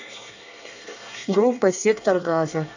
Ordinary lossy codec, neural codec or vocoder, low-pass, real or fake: none; codec, 24 kHz, 1 kbps, SNAC; 7.2 kHz; fake